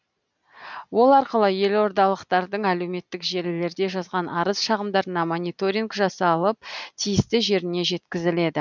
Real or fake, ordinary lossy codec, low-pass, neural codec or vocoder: real; none; 7.2 kHz; none